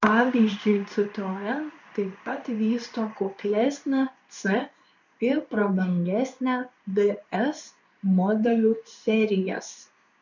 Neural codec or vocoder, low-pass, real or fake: codec, 16 kHz in and 24 kHz out, 1 kbps, XY-Tokenizer; 7.2 kHz; fake